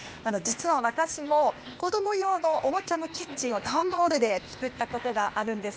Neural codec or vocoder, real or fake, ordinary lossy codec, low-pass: codec, 16 kHz, 0.8 kbps, ZipCodec; fake; none; none